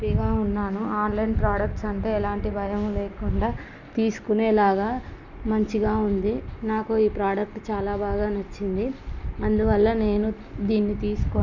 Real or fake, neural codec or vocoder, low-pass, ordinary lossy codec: real; none; 7.2 kHz; none